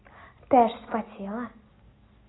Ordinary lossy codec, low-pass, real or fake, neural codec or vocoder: AAC, 16 kbps; 7.2 kHz; real; none